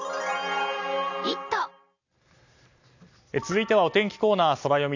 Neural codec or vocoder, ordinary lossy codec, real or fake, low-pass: none; none; real; 7.2 kHz